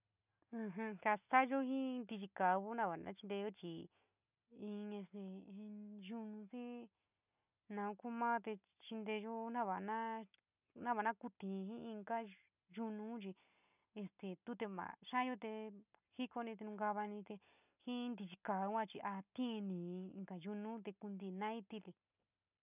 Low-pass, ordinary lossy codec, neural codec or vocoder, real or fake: 3.6 kHz; none; none; real